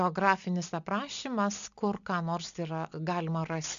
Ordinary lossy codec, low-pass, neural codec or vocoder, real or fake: MP3, 96 kbps; 7.2 kHz; none; real